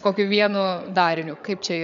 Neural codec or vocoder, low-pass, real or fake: codec, 16 kHz, 8 kbps, FreqCodec, larger model; 7.2 kHz; fake